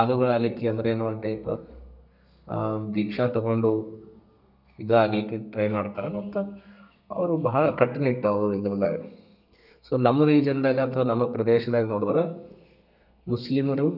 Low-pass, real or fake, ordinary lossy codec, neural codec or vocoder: 5.4 kHz; fake; none; codec, 32 kHz, 1.9 kbps, SNAC